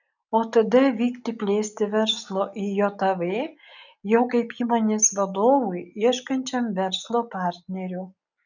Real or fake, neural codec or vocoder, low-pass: real; none; 7.2 kHz